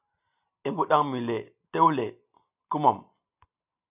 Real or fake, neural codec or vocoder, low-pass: real; none; 3.6 kHz